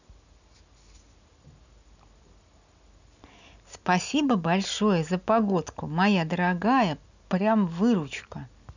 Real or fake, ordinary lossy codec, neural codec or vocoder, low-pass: real; none; none; 7.2 kHz